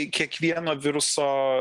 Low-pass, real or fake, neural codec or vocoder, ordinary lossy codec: 10.8 kHz; real; none; Opus, 24 kbps